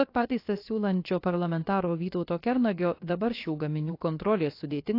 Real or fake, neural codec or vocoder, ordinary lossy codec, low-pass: fake; codec, 16 kHz, about 1 kbps, DyCAST, with the encoder's durations; AAC, 32 kbps; 5.4 kHz